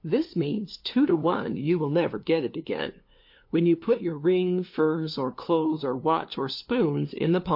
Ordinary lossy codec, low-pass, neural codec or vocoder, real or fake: MP3, 32 kbps; 5.4 kHz; codec, 16 kHz, 2 kbps, FunCodec, trained on LibriTTS, 25 frames a second; fake